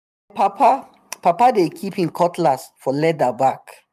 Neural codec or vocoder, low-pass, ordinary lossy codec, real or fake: vocoder, 44.1 kHz, 128 mel bands every 512 samples, BigVGAN v2; 14.4 kHz; none; fake